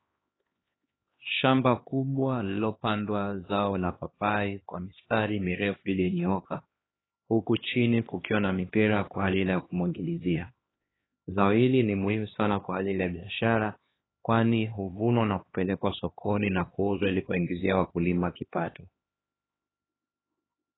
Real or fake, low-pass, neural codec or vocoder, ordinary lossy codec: fake; 7.2 kHz; codec, 16 kHz, 2 kbps, X-Codec, HuBERT features, trained on LibriSpeech; AAC, 16 kbps